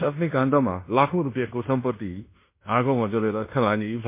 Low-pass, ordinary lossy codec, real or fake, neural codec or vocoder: 3.6 kHz; MP3, 24 kbps; fake; codec, 16 kHz in and 24 kHz out, 0.9 kbps, LongCat-Audio-Codec, fine tuned four codebook decoder